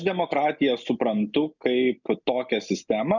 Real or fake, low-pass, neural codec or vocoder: real; 7.2 kHz; none